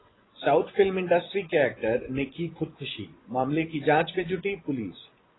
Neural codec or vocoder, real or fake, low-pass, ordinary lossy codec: vocoder, 24 kHz, 100 mel bands, Vocos; fake; 7.2 kHz; AAC, 16 kbps